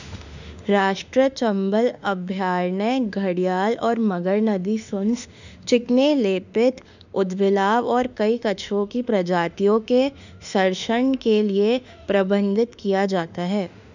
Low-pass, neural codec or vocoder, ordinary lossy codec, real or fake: 7.2 kHz; autoencoder, 48 kHz, 32 numbers a frame, DAC-VAE, trained on Japanese speech; none; fake